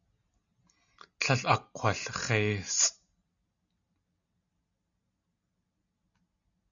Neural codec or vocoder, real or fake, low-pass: none; real; 7.2 kHz